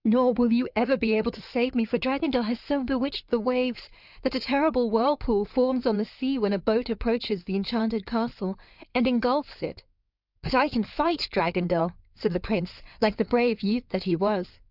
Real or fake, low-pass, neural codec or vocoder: fake; 5.4 kHz; codec, 16 kHz in and 24 kHz out, 2.2 kbps, FireRedTTS-2 codec